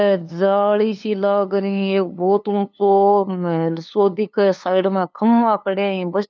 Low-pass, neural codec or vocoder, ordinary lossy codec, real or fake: none; codec, 16 kHz, 2 kbps, FunCodec, trained on LibriTTS, 25 frames a second; none; fake